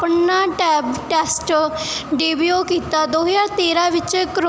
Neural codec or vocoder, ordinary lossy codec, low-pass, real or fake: none; none; none; real